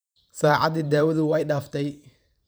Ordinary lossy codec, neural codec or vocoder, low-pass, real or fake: none; vocoder, 44.1 kHz, 128 mel bands every 512 samples, BigVGAN v2; none; fake